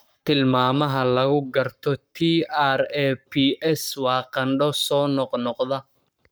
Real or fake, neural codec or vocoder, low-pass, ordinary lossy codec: fake; codec, 44.1 kHz, 7.8 kbps, Pupu-Codec; none; none